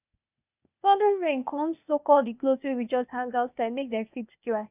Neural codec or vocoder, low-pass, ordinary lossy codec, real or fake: codec, 16 kHz, 0.8 kbps, ZipCodec; 3.6 kHz; none; fake